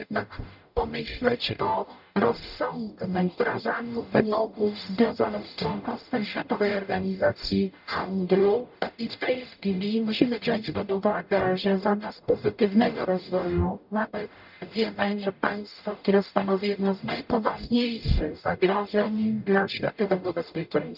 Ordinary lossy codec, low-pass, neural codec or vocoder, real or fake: none; 5.4 kHz; codec, 44.1 kHz, 0.9 kbps, DAC; fake